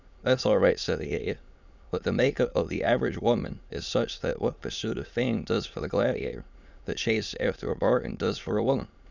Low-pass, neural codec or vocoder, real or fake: 7.2 kHz; autoencoder, 22.05 kHz, a latent of 192 numbers a frame, VITS, trained on many speakers; fake